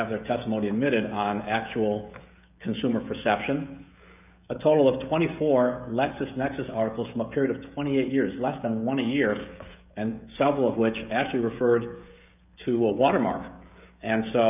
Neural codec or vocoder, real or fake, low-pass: codec, 16 kHz, 16 kbps, FreqCodec, smaller model; fake; 3.6 kHz